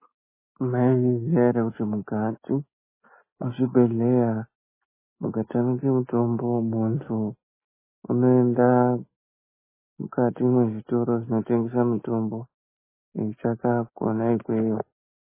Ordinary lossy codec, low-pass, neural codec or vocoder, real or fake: MP3, 16 kbps; 3.6 kHz; none; real